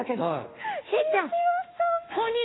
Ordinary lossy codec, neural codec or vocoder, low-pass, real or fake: AAC, 16 kbps; autoencoder, 48 kHz, 32 numbers a frame, DAC-VAE, trained on Japanese speech; 7.2 kHz; fake